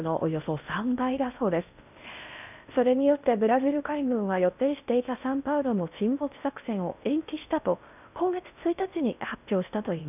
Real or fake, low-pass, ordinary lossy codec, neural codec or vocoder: fake; 3.6 kHz; none; codec, 16 kHz in and 24 kHz out, 0.6 kbps, FocalCodec, streaming, 4096 codes